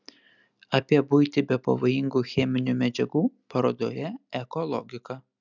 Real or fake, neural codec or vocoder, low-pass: real; none; 7.2 kHz